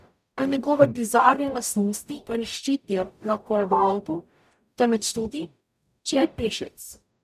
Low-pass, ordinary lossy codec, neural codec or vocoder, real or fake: 14.4 kHz; none; codec, 44.1 kHz, 0.9 kbps, DAC; fake